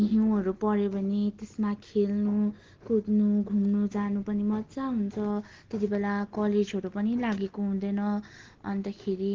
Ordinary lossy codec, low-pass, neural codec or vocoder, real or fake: Opus, 16 kbps; 7.2 kHz; none; real